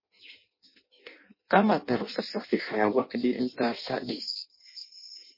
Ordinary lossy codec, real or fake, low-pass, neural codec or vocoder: MP3, 24 kbps; fake; 5.4 kHz; codec, 16 kHz in and 24 kHz out, 0.6 kbps, FireRedTTS-2 codec